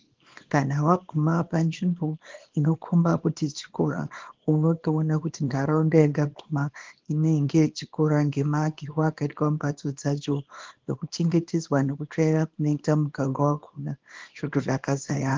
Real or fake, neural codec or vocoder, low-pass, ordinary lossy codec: fake; codec, 24 kHz, 0.9 kbps, WavTokenizer, small release; 7.2 kHz; Opus, 16 kbps